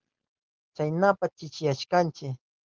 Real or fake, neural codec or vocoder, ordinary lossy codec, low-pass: real; none; Opus, 16 kbps; 7.2 kHz